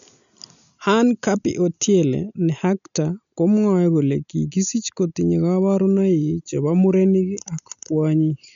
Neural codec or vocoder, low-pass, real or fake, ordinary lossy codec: none; 7.2 kHz; real; none